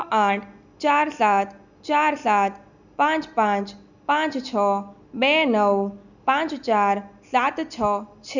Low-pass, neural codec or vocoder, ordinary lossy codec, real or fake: 7.2 kHz; none; none; real